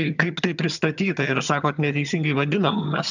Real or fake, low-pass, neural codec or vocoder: fake; 7.2 kHz; vocoder, 22.05 kHz, 80 mel bands, HiFi-GAN